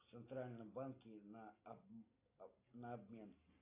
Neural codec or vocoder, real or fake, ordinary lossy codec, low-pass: none; real; AAC, 24 kbps; 3.6 kHz